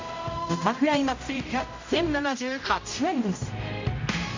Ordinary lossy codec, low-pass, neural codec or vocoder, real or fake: MP3, 48 kbps; 7.2 kHz; codec, 16 kHz, 0.5 kbps, X-Codec, HuBERT features, trained on general audio; fake